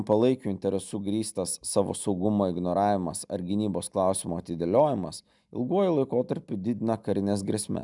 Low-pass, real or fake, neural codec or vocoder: 10.8 kHz; real; none